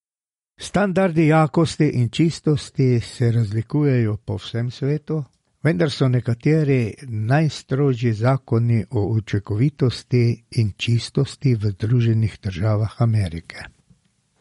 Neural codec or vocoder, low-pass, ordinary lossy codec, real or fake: none; 19.8 kHz; MP3, 48 kbps; real